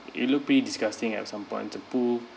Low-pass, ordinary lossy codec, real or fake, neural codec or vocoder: none; none; real; none